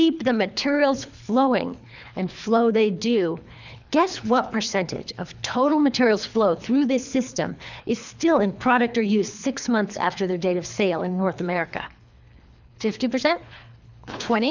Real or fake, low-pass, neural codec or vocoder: fake; 7.2 kHz; codec, 24 kHz, 3 kbps, HILCodec